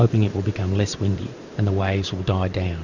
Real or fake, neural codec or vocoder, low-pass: fake; vocoder, 44.1 kHz, 128 mel bands every 512 samples, BigVGAN v2; 7.2 kHz